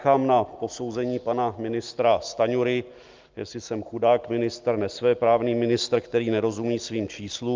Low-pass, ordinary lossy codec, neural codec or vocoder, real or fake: 7.2 kHz; Opus, 32 kbps; none; real